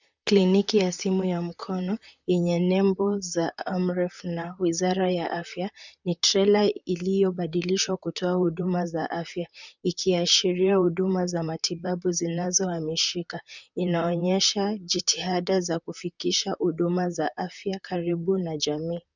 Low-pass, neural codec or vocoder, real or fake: 7.2 kHz; vocoder, 44.1 kHz, 128 mel bands, Pupu-Vocoder; fake